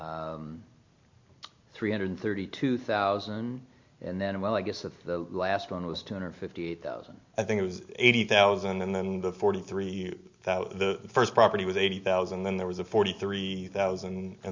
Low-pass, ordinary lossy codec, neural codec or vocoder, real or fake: 7.2 kHz; MP3, 64 kbps; none; real